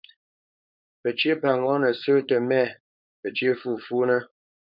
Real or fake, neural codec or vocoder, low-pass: fake; codec, 16 kHz, 4.8 kbps, FACodec; 5.4 kHz